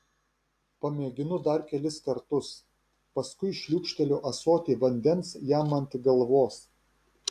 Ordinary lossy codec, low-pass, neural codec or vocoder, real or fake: AAC, 64 kbps; 14.4 kHz; none; real